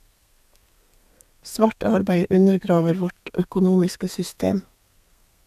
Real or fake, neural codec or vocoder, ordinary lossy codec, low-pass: fake; codec, 32 kHz, 1.9 kbps, SNAC; none; 14.4 kHz